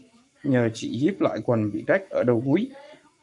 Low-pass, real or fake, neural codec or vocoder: 10.8 kHz; fake; codec, 44.1 kHz, 7.8 kbps, Pupu-Codec